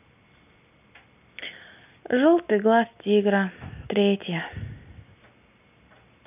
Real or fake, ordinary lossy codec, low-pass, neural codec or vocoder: real; none; 3.6 kHz; none